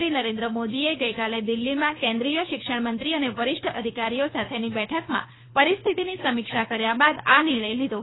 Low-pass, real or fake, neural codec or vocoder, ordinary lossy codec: 7.2 kHz; fake; codec, 24 kHz, 3 kbps, HILCodec; AAC, 16 kbps